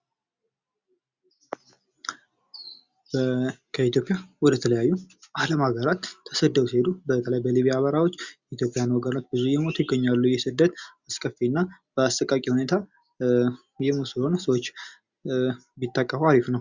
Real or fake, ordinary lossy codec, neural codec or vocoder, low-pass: real; Opus, 64 kbps; none; 7.2 kHz